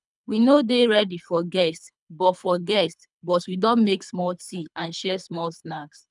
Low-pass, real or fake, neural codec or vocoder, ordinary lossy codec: 10.8 kHz; fake; codec, 24 kHz, 3 kbps, HILCodec; none